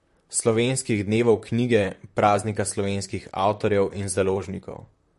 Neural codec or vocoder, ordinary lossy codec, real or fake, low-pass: vocoder, 44.1 kHz, 128 mel bands, Pupu-Vocoder; MP3, 48 kbps; fake; 14.4 kHz